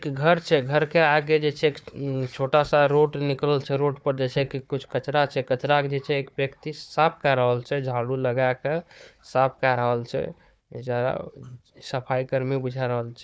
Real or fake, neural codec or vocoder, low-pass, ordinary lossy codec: fake; codec, 16 kHz, 4 kbps, FunCodec, trained on Chinese and English, 50 frames a second; none; none